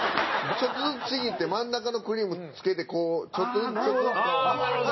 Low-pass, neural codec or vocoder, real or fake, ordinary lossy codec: 7.2 kHz; none; real; MP3, 24 kbps